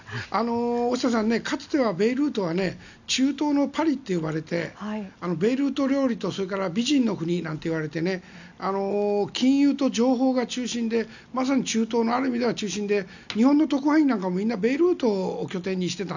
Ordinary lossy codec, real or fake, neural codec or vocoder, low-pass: none; real; none; 7.2 kHz